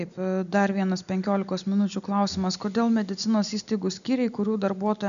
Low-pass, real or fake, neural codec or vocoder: 7.2 kHz; real; none